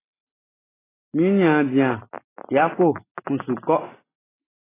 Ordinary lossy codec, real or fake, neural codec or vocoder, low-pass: AAC, 16 kbps; real; none; 3.6 kHz